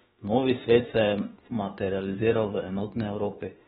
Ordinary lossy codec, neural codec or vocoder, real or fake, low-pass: AAC, 16 kbps; autoencoder, 48 kHz, 32 numbers a frame, DAC-VAE, trained on Japanese speech; fake; 19.8 kHz